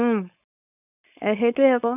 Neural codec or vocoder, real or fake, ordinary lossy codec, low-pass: codec, 16 kHz, 4.8 kbps, FACodec; fake; none; 3.6 kHz